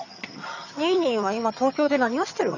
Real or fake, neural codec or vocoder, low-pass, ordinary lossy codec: fake; vocoder, 22.05 kHz, 80 mel bands, HiFi-GAN; 7.2 kHz; none